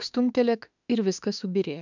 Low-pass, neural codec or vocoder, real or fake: 7.2 kHz; autoencoder, 48 kHz, 32 numbers a frame, DAC-VAE, trained on Japanese speech; fake